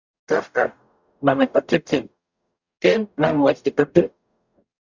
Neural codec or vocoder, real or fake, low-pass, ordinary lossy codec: codec, 44.1 kHz, 0.9 kbps, DAC; fake; 7.2 kHz; Opus, 64 kbps